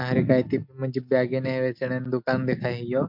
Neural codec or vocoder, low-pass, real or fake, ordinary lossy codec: none; 5.4 kHz; real; MP3, 48 kbps